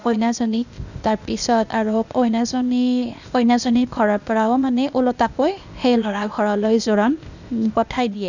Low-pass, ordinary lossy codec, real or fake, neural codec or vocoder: 7.2 kHz; none; fake; codec, 16 kHz, 0.8 kbps, ZipCodec